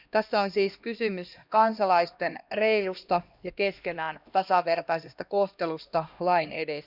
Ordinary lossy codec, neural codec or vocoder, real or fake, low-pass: none; codec, 16 kHz, 1 kbps, X-Codec, HuBERT features, trained on LibriSpeech; fake; 5.4 kHz